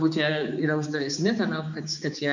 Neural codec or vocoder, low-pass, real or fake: codec, 16 kHz, 4 kbps, X-Codec, HuBERT features, trained on balanced general audio; 7.2 kHz; fake